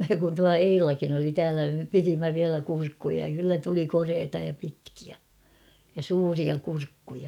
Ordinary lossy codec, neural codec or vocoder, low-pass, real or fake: none; codec, 44.1 kHz, 7.8 kbps, DAC; 19.8 kHz; fake